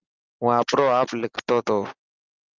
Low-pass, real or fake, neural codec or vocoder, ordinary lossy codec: 7.2 kHz; real; none; Opus, 32 kbps